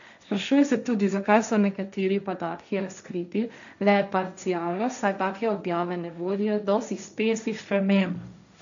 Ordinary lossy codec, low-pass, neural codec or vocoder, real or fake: none; 7.2 kHz; codec, 16 kHz, 1.1 kbps, Voila-Tokenizer; fake